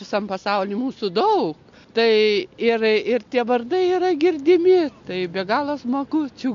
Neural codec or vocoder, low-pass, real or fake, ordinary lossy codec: none; 7.2 kHz; real; MP3, 48 kbps